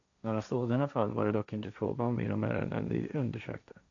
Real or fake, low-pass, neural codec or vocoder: fake; 7.2 kHz; codec, 16 kHz, 1.1 kbps, Voila-Tokenizer